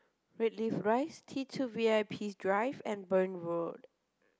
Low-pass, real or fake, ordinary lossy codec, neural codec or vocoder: none; real; none; none